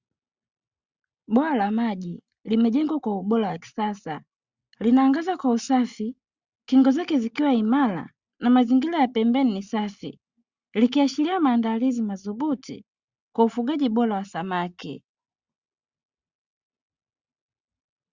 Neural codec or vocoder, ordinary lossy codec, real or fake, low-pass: none; Opus, 64 kbps; real; 7.2 kHz